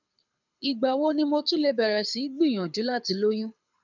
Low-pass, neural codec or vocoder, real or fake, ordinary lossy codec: 7.2 kHz; codec, 24 kHz, 6 kbps, HILCodec; fake; none